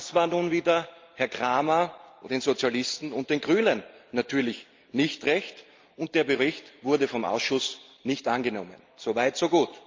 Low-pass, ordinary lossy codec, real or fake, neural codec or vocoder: 7.2 kHz; Opus, 24 kbps; real; none